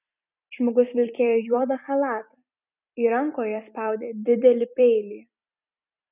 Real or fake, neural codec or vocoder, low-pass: fake; vocoder, 44.1 kHz, 128 mel bands every 256 samples, BigVGAN v2; 3.6 kHz